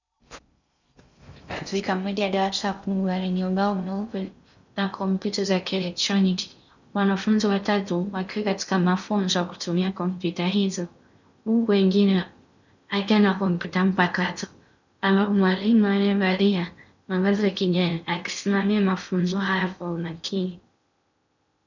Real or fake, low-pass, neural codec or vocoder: fake; 7.2 kHz; codec, 16 kHz in and 24 kHz out, 0.6 kbps, FocalCodec, streaming, 2048 codes